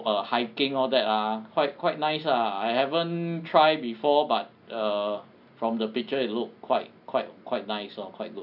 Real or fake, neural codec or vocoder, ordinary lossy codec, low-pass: real; none; none; 5.4 kHz